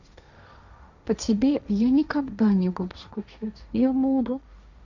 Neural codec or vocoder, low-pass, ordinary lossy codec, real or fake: codec, 16 kHz, 1.1 kbps, Voila-Tokenizer; 7.2 kHz; none; fake